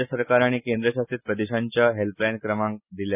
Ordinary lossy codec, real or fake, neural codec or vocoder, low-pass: none; real; none; 3.6 kHz